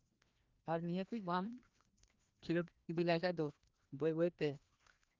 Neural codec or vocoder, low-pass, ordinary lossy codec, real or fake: codec, 16 kHz, 1 kbps, FreqCodec, larger model; 7.2 kHz; Opus, 32 kbps; fake